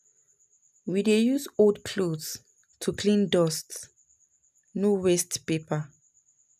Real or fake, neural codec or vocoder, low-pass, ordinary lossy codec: real; none; 14.4 kHz; none